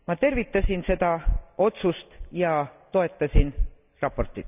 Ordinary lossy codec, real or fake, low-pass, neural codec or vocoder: none; real; 3.6 kHz; none